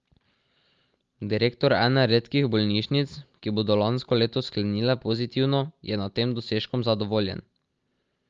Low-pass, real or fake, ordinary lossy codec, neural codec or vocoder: 7.2 kHz; real; Opus, 32 kbps; none